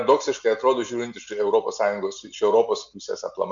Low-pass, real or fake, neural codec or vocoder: 7.2 kHz; real; none